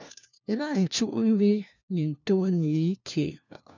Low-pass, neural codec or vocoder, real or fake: 7.2 kHz; codec, 16 kHz, 1 kbps, FunCodec, trained on LibriTTS, 50 frames a second; fake